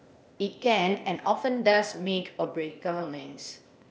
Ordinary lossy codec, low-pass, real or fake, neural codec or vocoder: none; none; fake; codec, 16 kHz, 0.8 kbps, ZipCodec